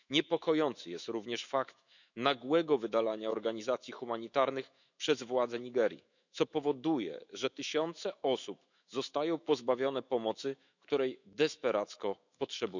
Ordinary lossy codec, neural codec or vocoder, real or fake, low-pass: none; autoencoder, 48 kHz, 128 numbers a frame, DAC-VAE, trained on Japanese speech; fake; 7.2 kHz